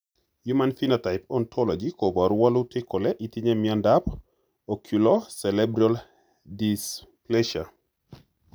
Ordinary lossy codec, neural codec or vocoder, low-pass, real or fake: none; none; none; real